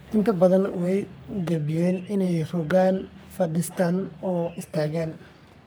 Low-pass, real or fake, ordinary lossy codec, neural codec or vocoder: none; fake; none; codec, 44.1 kHz, 3.4 kbps, Pupu-Codec